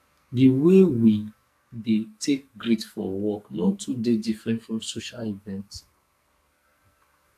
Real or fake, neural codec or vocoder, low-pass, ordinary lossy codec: fake; codec, 44.1 kHz, 2.6 kbps, SNAC; 14.4 kHz; AAC, 64 kbps